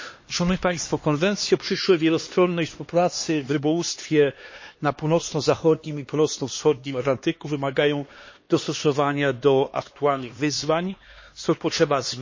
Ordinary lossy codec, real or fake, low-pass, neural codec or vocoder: MP3, 32 kbps; fake; 7.2 kHz; codec, 16 kHz, 2 kbps, X-Codec, HuBERT features, trained on LibriSpeech